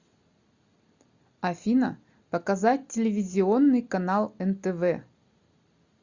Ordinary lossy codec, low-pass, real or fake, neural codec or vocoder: Opus, 64 kbps; 7.2 kHz; real; none